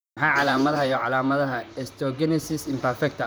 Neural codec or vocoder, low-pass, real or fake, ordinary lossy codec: vocoder, 44.1 kHz, 128 mel bands, Pupu-Vocoder; none; fake; none